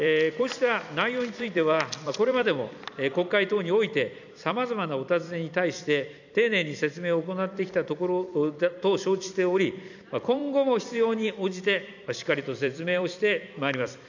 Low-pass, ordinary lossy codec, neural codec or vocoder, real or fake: 7.2 kHz; none; vocoder, 44.1 kHz, 128 mel bands every 256 samples, BigVGAN v2; fake